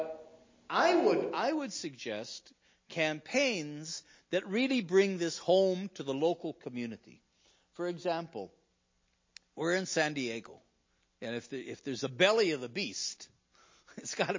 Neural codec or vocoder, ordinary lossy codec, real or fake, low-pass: none; MP3, 32 kbps; real; 7.2 kHz